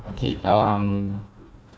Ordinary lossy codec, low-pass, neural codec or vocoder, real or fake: none; none; codec, 16 kHz, 1 kbps, FunCodec, trained on Chinese and English, 50 frames a second; fake